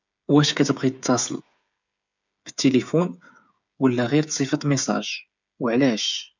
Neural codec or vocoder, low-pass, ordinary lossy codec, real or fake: codec, 16 kHz, 8 kbps, FreqCodec, smaller model; 7.2 kHz; none; fake